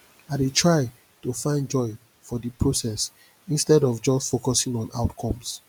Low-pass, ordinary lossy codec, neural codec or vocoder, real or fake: none; none; none; real